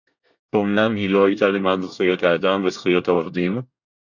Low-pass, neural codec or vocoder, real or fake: 7.2 kHz; codec, 24 kHz, 1 kbps, SNAC; fake